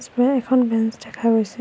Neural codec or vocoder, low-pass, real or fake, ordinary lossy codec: none; none; real; none